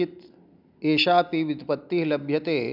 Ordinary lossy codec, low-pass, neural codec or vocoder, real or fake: none; 5.4 kHz; none; real